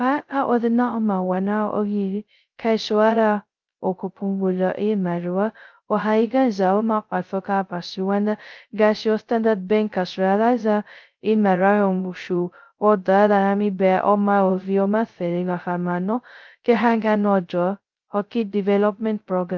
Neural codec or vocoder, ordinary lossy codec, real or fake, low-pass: codec, 16 kHz, 0.2 kbps, FocalCodec; Opus, 32 kbps; fake; 7.2 kHz